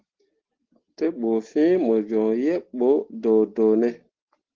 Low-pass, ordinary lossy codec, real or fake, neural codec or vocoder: 7.2 kHz; Opus, 16 kbps; real; none